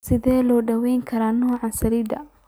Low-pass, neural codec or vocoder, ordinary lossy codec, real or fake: none; none; none; real